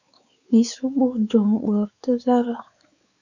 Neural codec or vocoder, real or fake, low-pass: codec, 16 kHz, 4 kbps, X-Codec, WavLM features, trained on Multilingual LibriSpeech; fake; 7.2 kHz